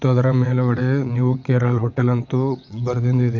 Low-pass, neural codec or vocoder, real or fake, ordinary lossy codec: 7.2 kHz; vocoder, 22.05 kHz, 80 mel bands, WaveNeXt; fake; MP3, 48 kbps